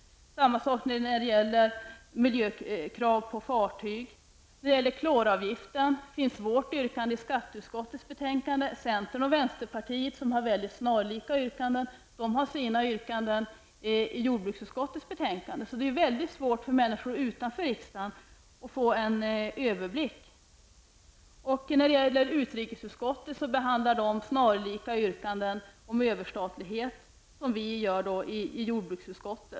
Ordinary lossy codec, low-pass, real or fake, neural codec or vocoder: none; none; real; none